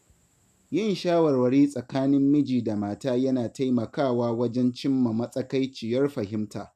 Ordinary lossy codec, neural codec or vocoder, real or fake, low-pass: none; none; real; 14.4 kHz